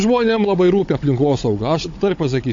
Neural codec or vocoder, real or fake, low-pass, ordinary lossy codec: codec, 16 kHz, 16 kbps, FunCodec, trained on Chinese and English, 50 frames a second; fake; 7.2 kHz; AAC, 48 kbps